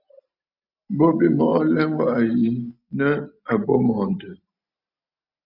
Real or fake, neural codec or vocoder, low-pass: real; none; 5.4 kHz